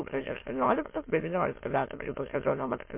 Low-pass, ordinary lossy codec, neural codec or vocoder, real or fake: 3.6 kHz; MP3, 24 kbps; autoencoder, 22.05 kHz, a latent of 192 numbers a frame, VITS, trained on many speakers; fake